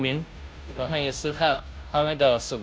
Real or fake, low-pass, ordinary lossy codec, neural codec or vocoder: fake; none; none; codec, 16 kHz, 0.5 kbps, FunCodec, trained on Chinese and English, 25 frames a second